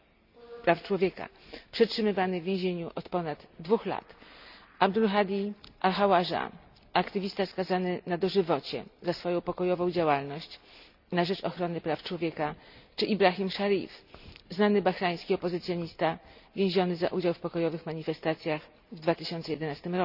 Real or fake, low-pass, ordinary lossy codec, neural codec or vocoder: real; 5.4 kHz; none; none